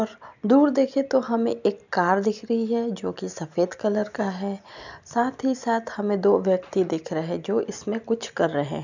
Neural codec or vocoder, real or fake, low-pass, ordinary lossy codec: none; real; 7.2 kHz; none